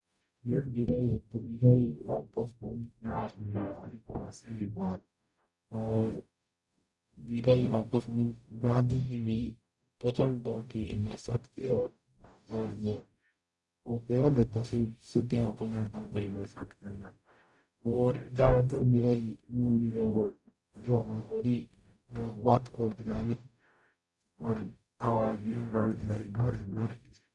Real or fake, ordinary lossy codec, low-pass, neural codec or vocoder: fake; none; 10.8 kHz; codec, 44.1 kHz, 0.9 kbps, DAC